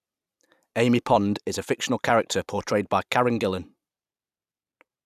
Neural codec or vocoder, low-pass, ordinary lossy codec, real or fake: vocoder, 44.1 kHz, 128 mel bands every 256 samples, BigVGAN v2; 14.4 kHz; none; fake